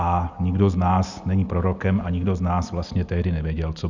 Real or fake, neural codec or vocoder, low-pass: real; none; 7.2 kHz